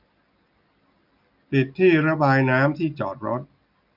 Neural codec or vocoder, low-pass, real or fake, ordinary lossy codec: none; 5.4 kHz; real; none